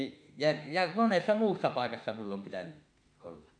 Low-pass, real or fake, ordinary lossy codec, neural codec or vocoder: 9.9 kHz; fake; none; autoencoder, 48 kHz, 32 numbers a frame, DAC-VAE, trained on Japanese speech